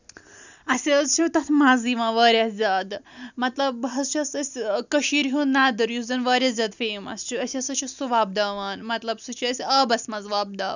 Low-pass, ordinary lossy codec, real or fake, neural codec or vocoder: 7.2 kHz; none; real; none